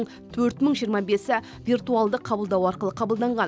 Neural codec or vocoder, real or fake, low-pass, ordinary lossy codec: none; real; none; none